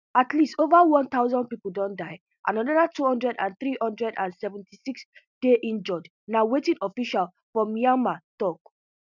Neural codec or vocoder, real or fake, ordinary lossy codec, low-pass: none; real; none; 7.2 kHz